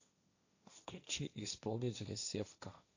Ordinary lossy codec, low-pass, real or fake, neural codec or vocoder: AAC, 48 kbps; 7.2 kHz; fake; codec, 16 kHz, 1.1 kbps, Voila-Tokenizer